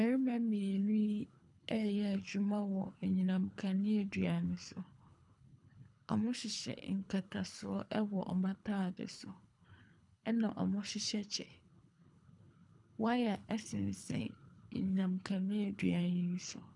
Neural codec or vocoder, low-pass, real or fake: codec, 24 kHz, 3 kbps, HILCodec; 10.8 kHz; fake